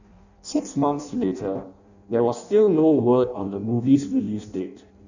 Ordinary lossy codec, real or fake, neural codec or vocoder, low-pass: none; fake; codec, 16 kHz in and 24 kHz out, 0.6 kbps, FireRedTTS-2 codec; 7.2 kHz